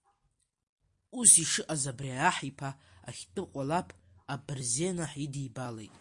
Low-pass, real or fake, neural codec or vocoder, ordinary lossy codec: 10.8 kHz; real; none; MP3, 48 kbps